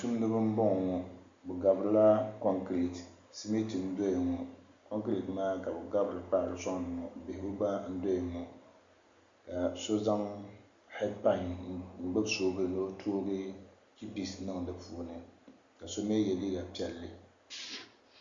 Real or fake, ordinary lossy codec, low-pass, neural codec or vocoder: real; Opus, 64 kbps; 7.2 kHz; none